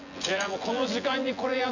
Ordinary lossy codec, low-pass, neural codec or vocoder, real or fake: none; 7.2 kHz; vocoder, 24 kHz, 100 mel bands, Vocos; fake